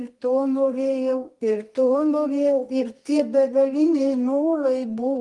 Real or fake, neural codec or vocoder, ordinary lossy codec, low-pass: fake; codec, 24 kHz, 0.9 kbps, WavTokenizer, medium music audio release; Opus, 24 kbps; 10.8 kHz